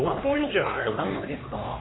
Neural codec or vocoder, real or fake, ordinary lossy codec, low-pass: codec, 16 kHz, 2 kbps, X-Codec, HuBERT features, trained on LibriSpeech; fake; AAC, 16 kbps; 7.2 kHz